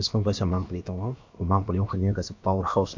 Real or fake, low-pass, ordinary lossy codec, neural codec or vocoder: fake; 7.2 kHz; MP3, 48 kbps; codec, 16 kHz, about 1 kbps, DyCAST, with the encoder's durations